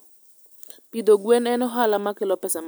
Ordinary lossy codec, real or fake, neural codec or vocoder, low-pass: none; real; none; none